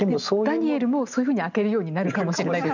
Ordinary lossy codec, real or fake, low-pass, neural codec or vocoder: none; real; 7.2 kHz; none